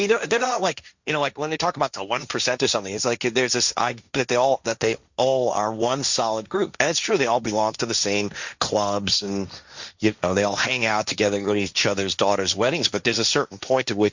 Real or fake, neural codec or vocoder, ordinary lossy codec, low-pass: fake; codec, 16 kHz, 1.1 kbps, Voila-Tokenizer; Opus, 64 kbps; 7.2 kHz